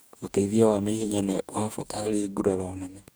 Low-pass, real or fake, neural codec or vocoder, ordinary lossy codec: none; fake; codec, 44.1 kHz, 2.6 kbps, DAC; none